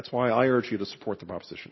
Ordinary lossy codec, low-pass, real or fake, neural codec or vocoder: MP3, 24 kbps; 7.2 kHz; real; none